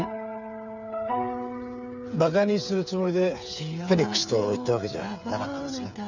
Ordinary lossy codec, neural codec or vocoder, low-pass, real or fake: none; codec, 16 kHz, 8 kbps, FreqCodec, smaller model; 7.2 kHz; fake